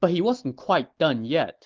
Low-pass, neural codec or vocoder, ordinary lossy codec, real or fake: 7.2 kHz; none; Opus, 16 kbps; real